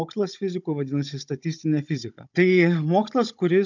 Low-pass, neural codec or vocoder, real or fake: 7.2 kHz; codec, 16 kHz, 16 kbps, FunCodec, trained on Chinese and English, 50 frames a second; fake